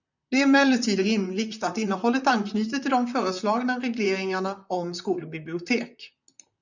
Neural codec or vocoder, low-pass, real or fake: vocoder, 44.1 kHz, 128 mel bands, Pupu-Vocoder; 7.2 kHz; fake